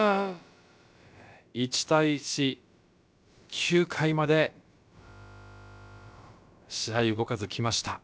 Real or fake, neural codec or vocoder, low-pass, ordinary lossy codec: fake; codec, 16 kHz, about 1 kbps, DyCAST, with the encoder's durations; none; none